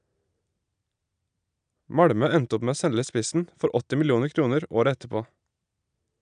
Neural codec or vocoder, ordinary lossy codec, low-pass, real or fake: none; none; 9.9 kHz; real